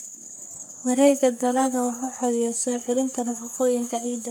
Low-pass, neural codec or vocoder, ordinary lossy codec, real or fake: none; codec, 44.1 kHz, 3.4 kbps, Pupu-Codec; none; fake